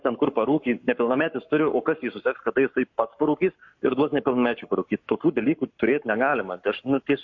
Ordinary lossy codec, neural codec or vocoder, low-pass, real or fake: MP3, 48 kbps; codec, 44.1 kHz, 7.8 kbps, Pupu-Codec; 7.2 kHz; fake